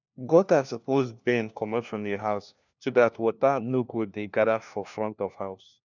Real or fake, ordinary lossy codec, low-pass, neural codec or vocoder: fake; none; 7.2 kHz; codec, 16 kHz, 1 kbps, FunCodec, trained on LibriTTS, 50 frames a second